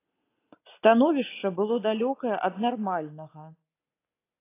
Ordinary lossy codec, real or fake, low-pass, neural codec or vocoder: AAC, 24 kbps; real; 3.6 kHz; none